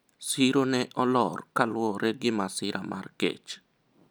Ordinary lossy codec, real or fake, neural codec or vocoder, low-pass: none; real; none; none